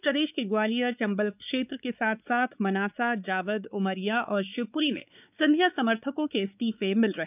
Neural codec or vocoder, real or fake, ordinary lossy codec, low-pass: codec, 16 kHz, 4 kbps, X-Codec, WavLM features, trained on Multilingual LibriSpeech; fake; none; 3.6 kHz